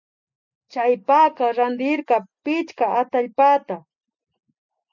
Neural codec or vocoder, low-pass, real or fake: none; 7.2 kHz; real